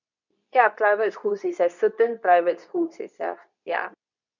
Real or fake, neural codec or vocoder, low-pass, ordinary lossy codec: fake; codec, 24 kHz, 0.9 kbps, WavTokenizer, medium speech release version 2; 7.2 kHz; none